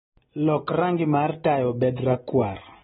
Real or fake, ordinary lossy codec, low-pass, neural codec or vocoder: real; AAC, 16 kbps; 19.8 kHz; none